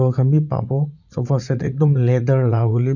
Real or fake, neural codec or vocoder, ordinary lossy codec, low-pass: fake; vocoder, 44.1 kHz, 80 mel bands, Vocos; none; 7.2 kHz